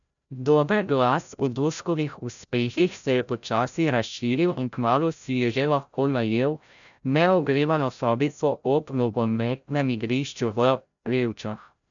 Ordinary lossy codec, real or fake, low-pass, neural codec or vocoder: none; fake; 7.2 kHz; codec, 16 kHz, 0.5 kbps, FreqCodec, larger model